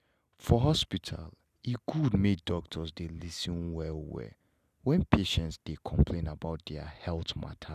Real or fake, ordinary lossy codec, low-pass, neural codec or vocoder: real; none; 14.4 kHz; none